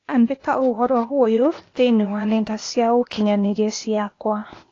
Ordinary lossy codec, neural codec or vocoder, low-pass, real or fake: AAC, 32 kbps; codec, 16 kHz, 0.8 kbps, ZipCodec; 7.2 kHz; fake